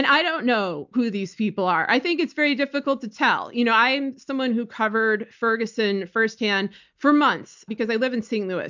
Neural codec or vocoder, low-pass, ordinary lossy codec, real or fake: none; 7.2 kHz; MP3, 64 kbps; real